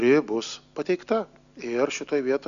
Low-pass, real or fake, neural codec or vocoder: 7.2 kHz; real; none